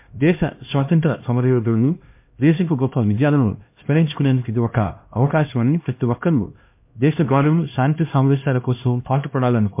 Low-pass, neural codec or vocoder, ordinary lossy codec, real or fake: 3.6 kHz; codec, 16 kHz, 1 kbps, X-Codec, HuBERT features, trained on LibriSpeech; MP3, 32 kbps; fake